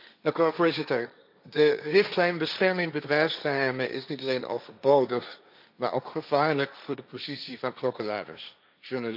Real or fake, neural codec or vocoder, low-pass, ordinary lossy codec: fake; codec, 16 kHz, 1.1 kbps, Voila-Tokenizer; 5.4 kHz; none